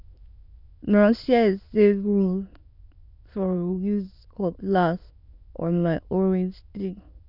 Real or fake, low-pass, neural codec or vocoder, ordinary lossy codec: fake; 5.4 kHz; autoencoder, 22.05 kHz, a latent of 192 numbers a frame, VITS, trained on many speakers; none